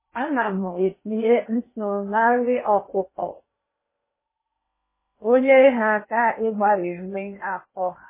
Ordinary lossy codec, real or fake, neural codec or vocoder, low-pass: MP3, 16 kbps; fake; codec, 16 kHz in and 24 kHz out, 0.8 kbps, FocalCodec, streaming, 65536 codes; 3.6 kHz